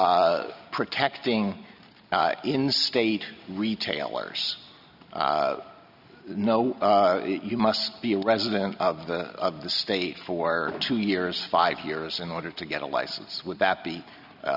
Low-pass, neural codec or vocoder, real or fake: 5.4 kHz; none; real